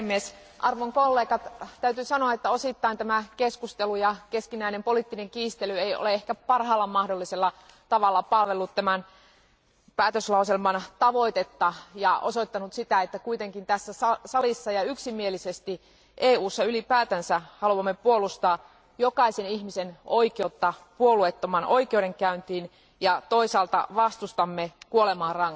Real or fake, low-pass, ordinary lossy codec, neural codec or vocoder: real; none; none; none